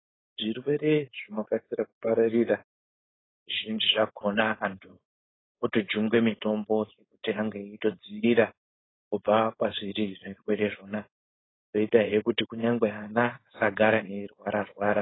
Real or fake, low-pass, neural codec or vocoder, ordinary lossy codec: fake; 7.2 kHz; codec, 16 kHz, 4.8 kbps, FACodec; AAC, 16 kbps